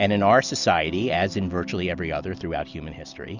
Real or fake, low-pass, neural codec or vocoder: real; 7.2 kHz; none